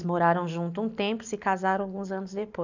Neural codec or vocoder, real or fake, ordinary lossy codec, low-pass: none; real; none; 7.2 kHz